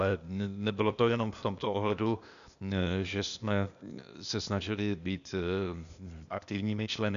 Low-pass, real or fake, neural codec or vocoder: 7.2 kHz; fake; codec, 16 kHz, 0.8 kbps, ZipCodec